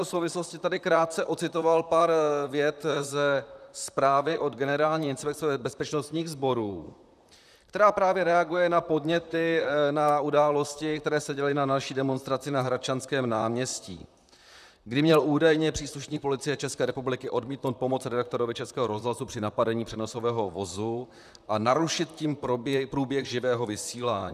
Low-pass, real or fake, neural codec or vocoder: 14.4 kHz; fake; vocoder, 44.1 kHz, 128 mel bands, Pupu-Vocoder